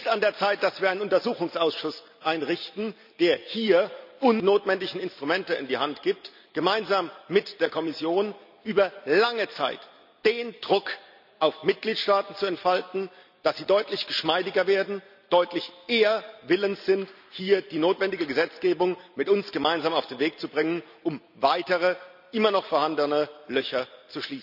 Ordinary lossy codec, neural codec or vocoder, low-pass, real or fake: none; none; 5.4 kHz; real